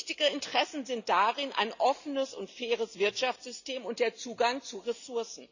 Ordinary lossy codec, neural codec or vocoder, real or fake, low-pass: none; none; real; 7.2 kHz